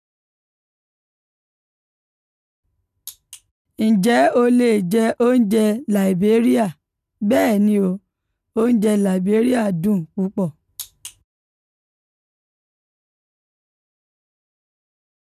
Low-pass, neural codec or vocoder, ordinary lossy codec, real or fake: 14.4 kHz; none; none; real